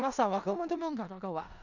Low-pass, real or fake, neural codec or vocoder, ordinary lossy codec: 7.2 kHz; fake; codec, 16 kHz in and 24 kHz out, 0.4 kbps, LongCat-Audio-Codec, four codebook decoder; none